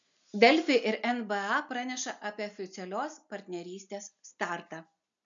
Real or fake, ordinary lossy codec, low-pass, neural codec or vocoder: real; MP3, 64 kbps; 7.2 kHz; none